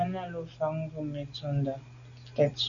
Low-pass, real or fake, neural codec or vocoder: 7.2 kHz; real; none